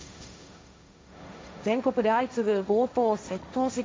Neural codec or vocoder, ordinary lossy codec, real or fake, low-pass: codec, 16 kHz, 1.1 kbps, Voila-Tokenizer; none; fake; none